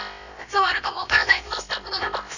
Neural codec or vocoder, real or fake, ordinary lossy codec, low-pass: codec, 16 kHz, about 1 kbps, DyCAST, with the encoder's durations; fake; none; 7.2 kHz